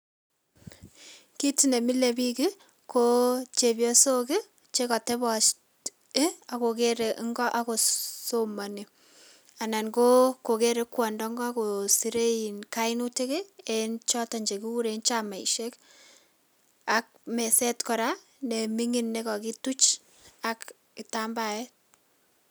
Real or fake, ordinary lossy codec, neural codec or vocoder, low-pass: real; none; none; none